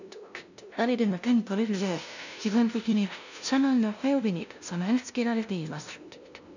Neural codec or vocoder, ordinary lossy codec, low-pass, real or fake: codec, 16 kHz, 0.5 kbps, FunCodec, trained on LibriTTS, 25 frames a second; none; 7.2 kHz; fake